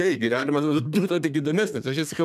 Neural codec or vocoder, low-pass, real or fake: codec, 32 kHz, 1.9 kbps, SNAC; 14.4 kHz; fake